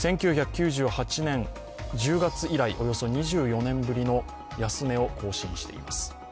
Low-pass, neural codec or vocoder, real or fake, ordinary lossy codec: none; none; real; none